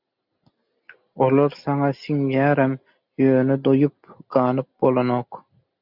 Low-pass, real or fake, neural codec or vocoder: 5.4 kHz; real; none